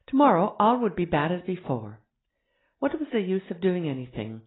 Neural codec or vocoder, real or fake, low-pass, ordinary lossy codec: none; real; 7.2 kHz; AAC, 16 kbps